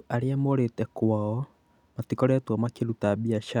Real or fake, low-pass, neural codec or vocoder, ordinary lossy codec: real; 19.8 kHz; none; none